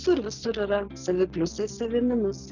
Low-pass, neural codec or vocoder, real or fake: 7.2 kHz; none; real